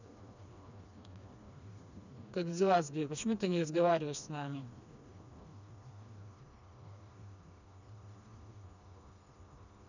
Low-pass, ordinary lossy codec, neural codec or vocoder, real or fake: 7.2 kHz; none; codec, 16 kHz, 2 kbps, FreqCodec, smaller model; fake